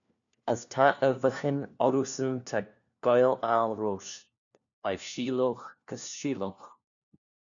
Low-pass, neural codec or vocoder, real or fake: 7.2 kHz; codec, 16 kHz, 1 kbps, FunCodec, trained on LibriTTS, 50 frames a second; fake